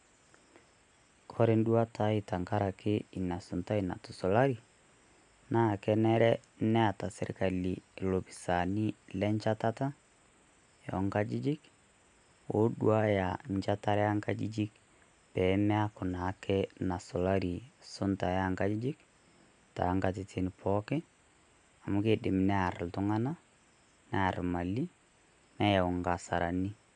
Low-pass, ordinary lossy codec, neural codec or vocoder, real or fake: 9.9 kHz; none; none; real